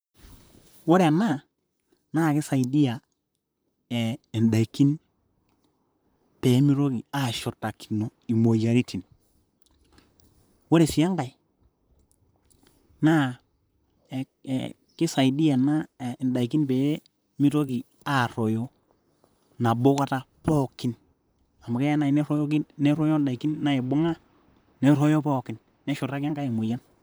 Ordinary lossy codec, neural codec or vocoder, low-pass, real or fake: none; vocoder, 44.1 kHz, 128 mel bands, Pupu-Vocoder; none; fake